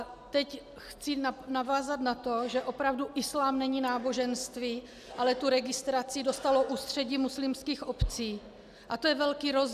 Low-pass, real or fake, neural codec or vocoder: 14.4 kHz; real; none